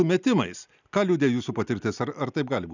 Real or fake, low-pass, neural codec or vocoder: real; 7.2 kHz; none